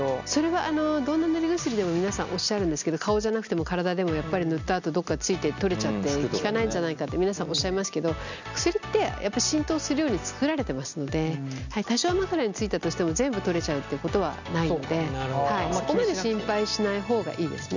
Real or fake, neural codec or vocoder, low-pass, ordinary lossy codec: real; none; 7.2 kHz; none